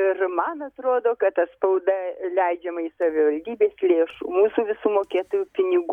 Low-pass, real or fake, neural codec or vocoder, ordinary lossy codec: 19.8 kHz; real; none; MP3, 96 kbps